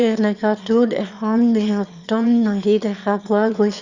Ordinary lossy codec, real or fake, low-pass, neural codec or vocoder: Opus, 64 kbps; fake; 7.2 kHz; autoencoder, 22.05 kHz, a latent of 192 numbers a frame, VITS, trained on one speaker